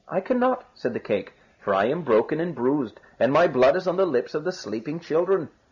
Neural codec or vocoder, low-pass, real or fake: none; 7.2 kHz; real